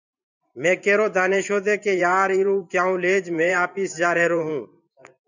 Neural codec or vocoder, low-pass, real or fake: vocoder, 44.1 kHz, 128 mel bands every 512 samples, BigVGAN v2; 7.2 kHz; fake